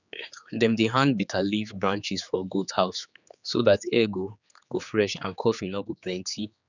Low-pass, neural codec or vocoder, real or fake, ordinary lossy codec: 7.2 kHz; codec, 16 kHz, 4 kbps, X-Codec, HuBERT features, trained on general audio; fake; none